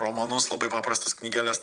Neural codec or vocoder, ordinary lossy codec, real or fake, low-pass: vocoder, 22.05 kHz, 80 mel bands, Vocos; Opus, 32 kbps; fake; 9.9 kHz